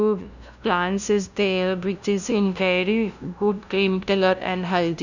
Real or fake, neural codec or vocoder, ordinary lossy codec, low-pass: fake; codec, 16 kHz, 0.5 kbps, FunCodec, trained on LibriTTS, 25 frames a second; none; 7.2 kHz